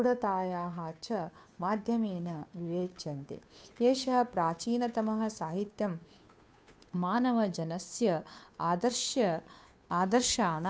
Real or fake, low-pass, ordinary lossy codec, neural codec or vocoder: fake; none; none; codec, 16 kHz, 2 kbps, FunCodec, trained on Chinese and English, 25 frames a second